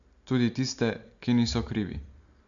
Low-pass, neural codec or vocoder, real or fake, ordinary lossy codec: 7.2 kHz; none; real; MP3, 64 kbps